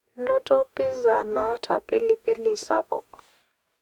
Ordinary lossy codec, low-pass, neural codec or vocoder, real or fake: none; 19.8 kHz; codec, 44.1 kHz, 2.6 kbps, DAC; fake